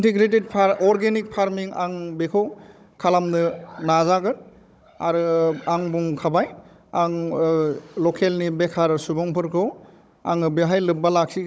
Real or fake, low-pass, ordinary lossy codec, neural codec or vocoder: fake; none; none; codec, 16 kHz, 16 kbps, FunCodec, trained on Chinese and English, 50 frames a second